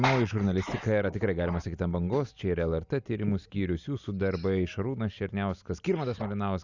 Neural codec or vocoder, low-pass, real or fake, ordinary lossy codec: none; 7.2 kHz; real; Opus, 64 kbps